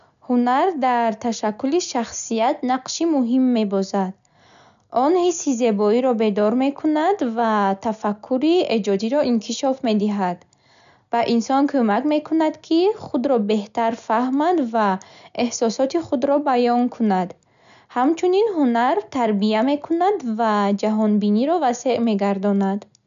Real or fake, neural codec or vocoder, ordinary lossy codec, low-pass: real; none; none; 7.2 kHz